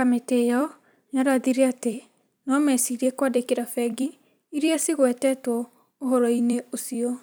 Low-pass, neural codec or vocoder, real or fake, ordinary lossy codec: none; vocoder, 44.1 kHz, 128 mel bands every 256 samples, BigVGAN v2; fake; none